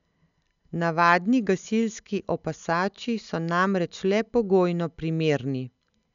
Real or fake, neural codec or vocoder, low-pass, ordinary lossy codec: real; none; 7.2 kHz; none